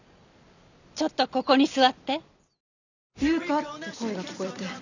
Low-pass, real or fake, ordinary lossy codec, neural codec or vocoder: 7.2 kHz; real; none; none